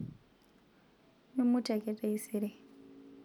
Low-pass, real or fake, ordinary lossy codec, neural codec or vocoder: 19.8 kHz; real; none; none